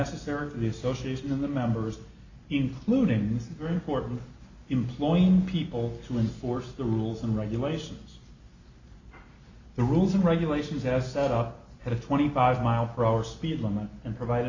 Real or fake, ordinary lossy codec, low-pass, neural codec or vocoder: real; Opus, 64 kbps; 7.2 kHz; none